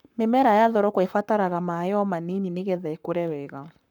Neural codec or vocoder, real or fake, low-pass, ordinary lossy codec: codec, 44.1 kHz, 7.8 kbps, Pupu-Codec; fake; 19.8 kHz; none